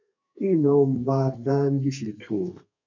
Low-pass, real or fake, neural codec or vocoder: 7.2 kHz; fake; codec, 32 kHz, 1.9 kbps, SNAC